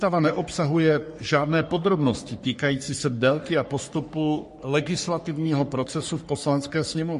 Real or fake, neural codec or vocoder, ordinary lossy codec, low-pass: fake; codec, 44.1 kHz, 3.4 kbps, Pupu-Codec; MP3, 48 kbps; 14.4 kHz